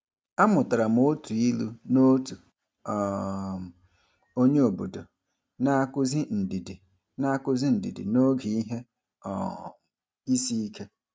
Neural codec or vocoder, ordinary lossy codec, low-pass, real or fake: none; none; none; real